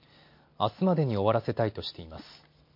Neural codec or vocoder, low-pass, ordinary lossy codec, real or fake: none; 5.4 kHz; MP3, 32 kbps; real